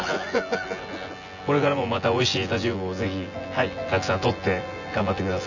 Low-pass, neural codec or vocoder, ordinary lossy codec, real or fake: 7.2 kHz; vocoder, 24 kHz, 100 mel bands, Vocos; none; fake